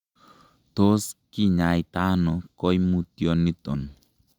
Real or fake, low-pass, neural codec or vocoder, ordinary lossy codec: real; 19.8 kHz; none; Opus, 64 kbps